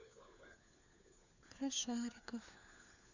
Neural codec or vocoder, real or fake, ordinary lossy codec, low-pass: codec, 16 kHz, 4 kbps, FunCodec, trained on LibriTTS, 50 frames a second; fake; none; 7.2 kHz